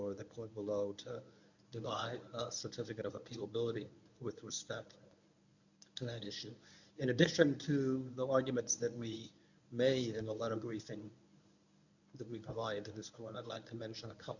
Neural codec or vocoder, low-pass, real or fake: codec, 24 kHz, 0.9 kbps, WavTokenizer, medium speech release version 1; 7.2 kHz; fake